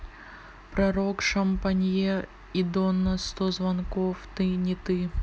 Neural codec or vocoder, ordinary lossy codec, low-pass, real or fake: none; none; none; real